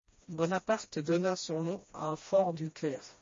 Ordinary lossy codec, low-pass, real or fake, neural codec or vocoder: MP3, 32 kbps; 7.2 kHz; fake; codec, 16 kHz, 1 kbps, FreqCodec, smaller model